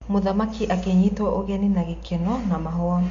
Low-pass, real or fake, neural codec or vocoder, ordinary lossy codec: 7.2 kHz; real; none; MP3, 48 kbps